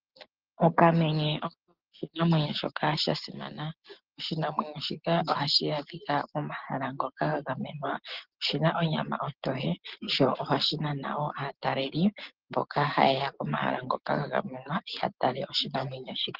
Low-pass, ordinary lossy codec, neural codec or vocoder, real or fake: 5.4 kHz; Opus, 16 kbps; none; real